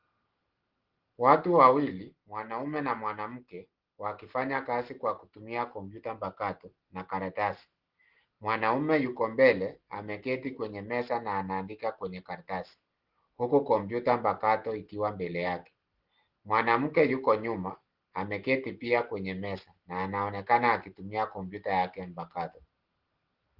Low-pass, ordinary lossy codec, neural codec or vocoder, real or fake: 5.4 kHz; Opus, 16 kbps; none; real